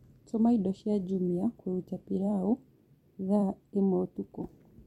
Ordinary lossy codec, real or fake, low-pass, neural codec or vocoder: MP3, 64 kbps; fake; 19.8 kHz; vocoder, 44.1 kHz, 128 mel bands every 256 samples, BigVGAN v2